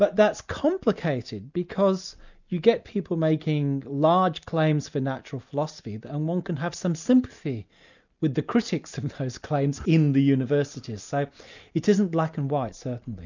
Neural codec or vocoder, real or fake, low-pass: none; real; 7.2 kHz